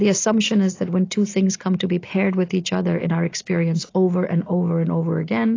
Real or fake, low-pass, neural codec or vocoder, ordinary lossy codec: real; 7.2 kHz; none; AAC, 32 kbps